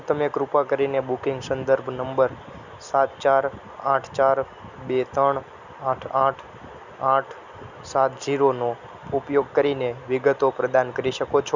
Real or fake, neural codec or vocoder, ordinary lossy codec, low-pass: real; none; none; 7.2 kHz